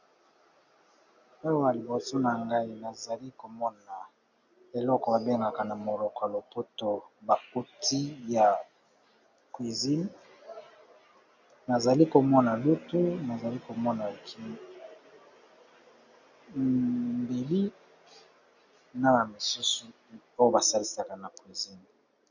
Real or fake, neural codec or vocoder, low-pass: real; none; 7.2 kHz